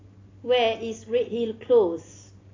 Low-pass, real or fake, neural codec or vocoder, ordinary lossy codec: 7.2 kHz; real; none; AAC, 32 kbps